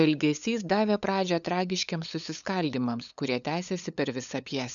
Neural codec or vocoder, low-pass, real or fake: codec, 16 kHz, 8 kbps, FunCodec, trained on LibriTTS, 25 frames a second; 7.2 kHz; fake